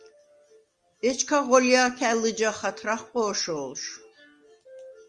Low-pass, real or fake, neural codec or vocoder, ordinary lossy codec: 9.9 kHz; real; none; Opus, 32 kbps